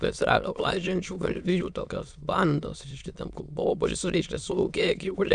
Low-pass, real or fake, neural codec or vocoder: 9.9 kHz; fake; autoencoder, 22.05 kHz, a latent of 192 numbers a frame, VITS, trained on many speakers